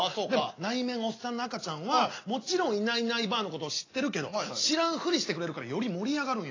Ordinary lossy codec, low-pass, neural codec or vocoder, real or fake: AAC, 32 kbps; 7.2 kHz; none; real